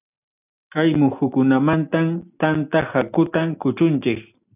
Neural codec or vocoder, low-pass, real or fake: none; 3.6 kHz; real